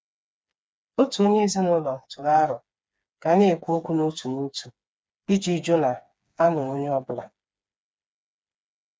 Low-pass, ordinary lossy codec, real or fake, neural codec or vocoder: none; none; fake; codec, 16 kHz, 4 kbps, FreqCodec, smaller model